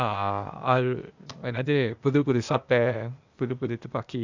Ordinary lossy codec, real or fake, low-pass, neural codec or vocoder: Opus, 64 kbps; fake; 7.2 kHz; codec, 16 kHz, 0.8 kbps, ZipCodec